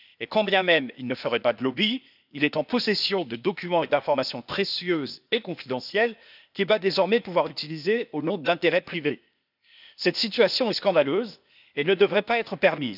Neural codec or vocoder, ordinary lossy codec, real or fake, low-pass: codec, 16 kHz, 0.8 kbps, ZipCodec; none; fake; 5.4 kHz